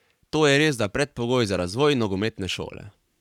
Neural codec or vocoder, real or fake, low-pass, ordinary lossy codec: codec, 44.1 kHz, 7.8 kbps, Pupu-Codec; fake; 19.8 kHz; none